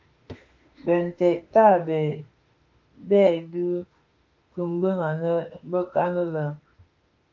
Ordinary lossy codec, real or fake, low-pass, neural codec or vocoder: Opus, 24 kbps; fake; 7.2 kHz; autoencoder, 48 kHz, 32 numbers a frame, DAC-VAE, trained on Japanese speech